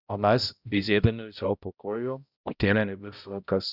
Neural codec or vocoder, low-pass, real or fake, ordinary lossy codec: codec, 16 kHz, 0.5 kbps, X-Codec, HuBERT features, trained on balanced general audio; 5.4 kHz; fake; Opus, 64 kbps